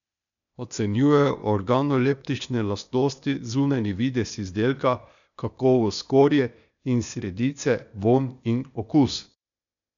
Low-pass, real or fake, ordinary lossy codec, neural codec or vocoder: 7.2 kHz; fake; none; codec, 16 kHz, 0.8 kbps, ZipCodec